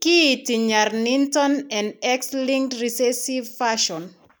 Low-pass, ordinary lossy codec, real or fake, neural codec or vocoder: none; none; real; none